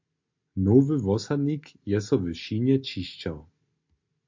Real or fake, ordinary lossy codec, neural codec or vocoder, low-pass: real; MP3, 48 kbps; none; 7.2 kHz